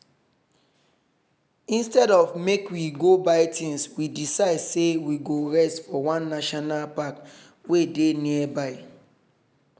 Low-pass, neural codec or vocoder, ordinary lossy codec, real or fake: none; none; none; real